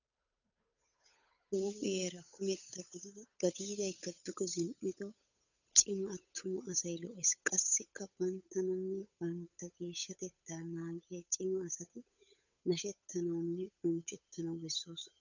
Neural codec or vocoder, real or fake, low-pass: codec, 16 kHz, 8 kbps, FunCodec, trained on Chinese and English, 25 frames a second; fake; 7.2 kHz